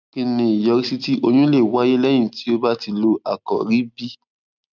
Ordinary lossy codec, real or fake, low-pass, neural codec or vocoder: none; real; 7.2 kHz; none